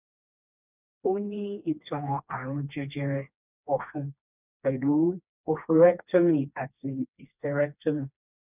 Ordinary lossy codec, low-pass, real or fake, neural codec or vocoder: none; 3.6 kHz; fake; codec, 16 kHz, 2 kbps, FreqCodec, smaller model